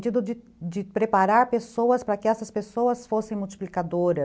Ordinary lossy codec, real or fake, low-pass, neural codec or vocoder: none; real; none; none